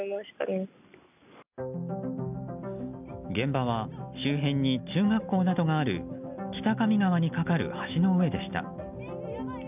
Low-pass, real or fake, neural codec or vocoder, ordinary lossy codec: 3.6 kHz; real; none; none